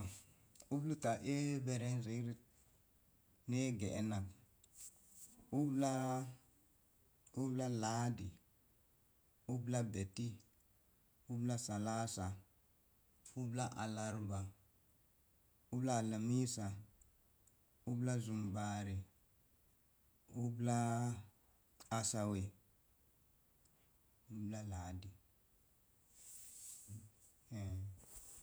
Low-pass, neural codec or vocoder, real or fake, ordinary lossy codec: none; vocoder, 48 kHz, 128 mel bands, Vocos; fake; none